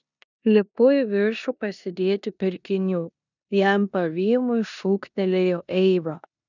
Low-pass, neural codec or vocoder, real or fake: 7.2 kHz; codec, 16 kHz in and 24 kHz out, 0.9 kbps, LongCat-Audio-Codec, four codebook decoder; fake